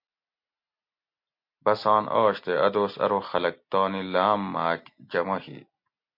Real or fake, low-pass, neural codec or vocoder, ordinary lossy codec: real; 5.4 kHz; none; MP3, 32 kbps